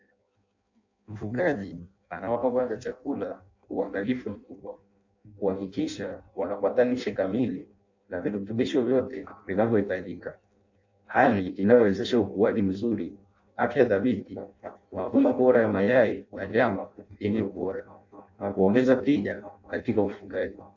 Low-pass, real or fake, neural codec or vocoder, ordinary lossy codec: 7.2 kHz; fake; codec, 16 kHz in and 24 kHz out, 0.6 kbps, FireRedTTS-2 codec; AAC, 48 kbps